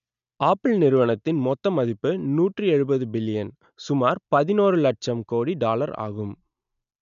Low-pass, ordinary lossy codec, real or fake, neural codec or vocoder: 7.2 kHz; none; real; none